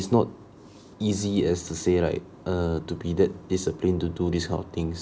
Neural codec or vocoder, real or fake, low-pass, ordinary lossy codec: none; real; none; none